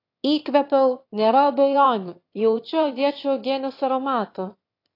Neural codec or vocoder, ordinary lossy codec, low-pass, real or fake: autoencoder, 22.05 kHz, a latent of 192 numbers a frame, VITS, trained on one speaker; AAC, 32 kbps; 5.4 kHz; fake